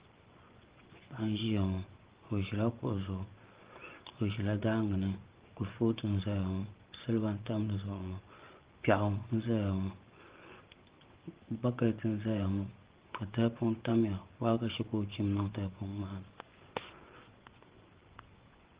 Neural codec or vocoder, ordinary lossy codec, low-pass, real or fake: vocoder, 24 kHz, 100 mel bands, Vocos; Opus, 16 kbps; 3.6 kHz; fake